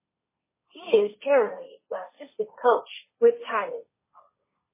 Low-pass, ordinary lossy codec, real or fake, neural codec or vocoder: 3.6 kHz; MP3, 16 kbps; fake; codec, 16 kHz, 1.1 kbps, Voila-Tokenizer